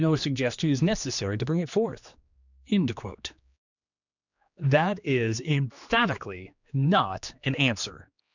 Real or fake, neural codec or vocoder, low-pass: fake; codec, 16 kHz, 2 kbps, X-Codec, HuBERT features, trained on general audio; 7.2 kHz